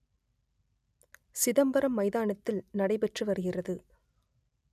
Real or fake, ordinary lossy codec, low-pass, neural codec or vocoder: real; none; 14.4 kHz; none